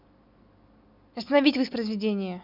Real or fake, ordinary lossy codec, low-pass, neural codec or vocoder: real; none; 5.4 kHz; none